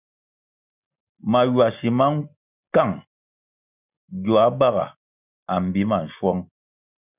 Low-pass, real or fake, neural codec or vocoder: 3.6 kHz; real; none